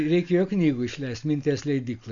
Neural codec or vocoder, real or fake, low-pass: none; real; 7.2 kHz